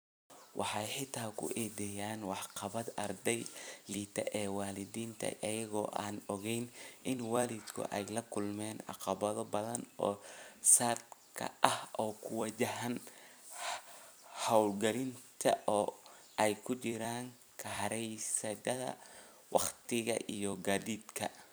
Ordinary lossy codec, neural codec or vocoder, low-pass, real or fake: none; vocoder, 44.1 kHz, 128 mel bands every 256 samples, BigVGAN v2; none; fake